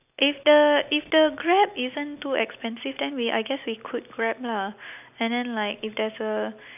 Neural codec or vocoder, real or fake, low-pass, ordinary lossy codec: none; real; 3.6 kHz; none